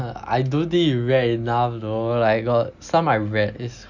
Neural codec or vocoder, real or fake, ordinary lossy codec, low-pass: none; real; none; 7.2 kHz